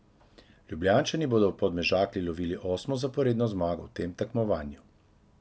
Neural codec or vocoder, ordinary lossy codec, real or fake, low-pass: none; none; real; none